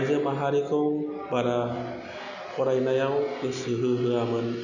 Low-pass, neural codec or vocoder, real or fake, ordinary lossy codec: 7.2 kHz; none; real; none